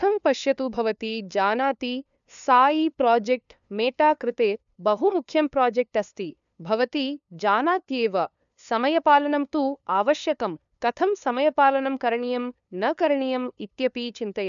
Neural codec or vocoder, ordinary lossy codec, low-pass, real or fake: codec, 16 kHz, 2 kbps, FunCodec, trained on LibriTTS, 25 frames a second; none; 7.2 kHz; fake